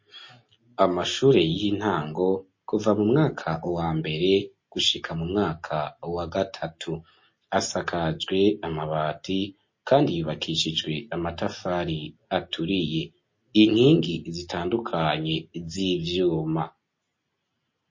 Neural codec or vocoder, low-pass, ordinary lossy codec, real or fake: none; 7.2 kHz; MP3, 32 kbps; real